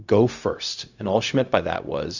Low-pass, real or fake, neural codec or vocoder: 7.2 kHz; fake; codec, 16 kHz, 0.4 kbps, LongCat-Audio-Codec